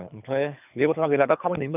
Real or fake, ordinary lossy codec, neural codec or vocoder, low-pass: fake; none; codec, 24 kHz, 3 kbps, HILCodec; 3.6 kHz